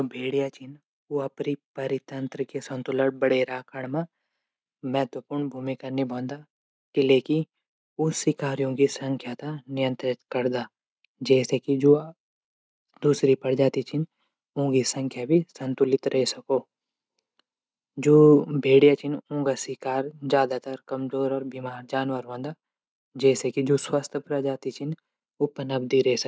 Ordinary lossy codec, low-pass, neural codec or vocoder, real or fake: none; none; none; real